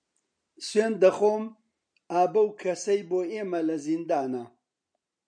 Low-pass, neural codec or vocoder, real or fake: 9.9 kHz; none; real